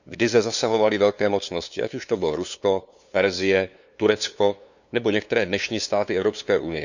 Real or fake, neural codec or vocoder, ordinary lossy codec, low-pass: fake; codec, 16 kHz, 2 kbps, FunCodec, trained on LibriTTS, 25 frames a second; none; 7.2 kHz